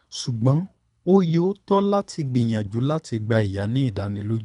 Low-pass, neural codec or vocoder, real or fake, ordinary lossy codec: 10.8 kHz; codec, 24 kHz, 3 kbps, HILCodec; fake; none